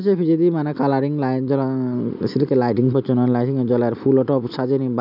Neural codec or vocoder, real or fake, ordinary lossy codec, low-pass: none; real; none; 5.4 kHz